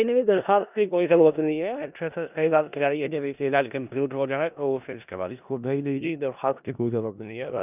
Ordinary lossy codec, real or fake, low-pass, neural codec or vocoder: none; fake; 3.6 kHz; codec, 16 kHz in and 24 kHz out, 0.4 kbps, LongCat-Audio-Codec, four codebook decoder